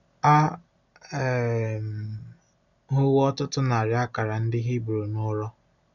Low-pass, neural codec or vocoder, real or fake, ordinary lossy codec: 7.2 kHz; none; real; none